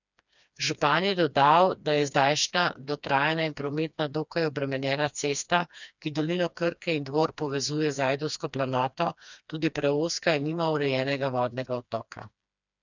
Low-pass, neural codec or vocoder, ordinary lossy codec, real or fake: 7.2 kHz; codec, 16 kHz, 2 kbps, FreqCodec, smaller model; none; fake